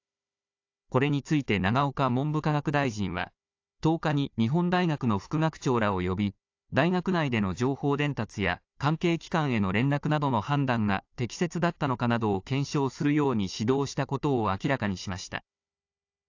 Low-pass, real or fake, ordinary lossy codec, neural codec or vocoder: 7.2 kHz; fake; AAC, 48 kbps; codec, 16 kHz, 4 kbps, FunCodec, trained on Chinese and English, 50 frames a second